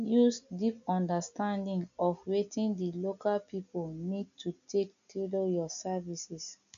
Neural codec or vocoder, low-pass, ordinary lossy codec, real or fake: none; 7.2 kHz; none; real